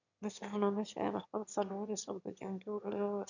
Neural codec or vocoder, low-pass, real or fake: autoencoder, 22.05 kHz, a latent of 192 numbers a frame, VITS, trained on one speaker; 7.2 kHz; fake